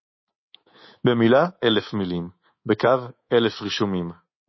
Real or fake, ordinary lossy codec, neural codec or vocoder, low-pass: fake; MP3, 24 kbps; codec, 24 kHz, 3.1 kbps, DualCodec; 7.2 kHz